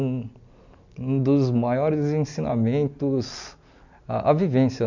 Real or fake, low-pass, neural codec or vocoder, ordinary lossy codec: real; 7.2 kHz; none; none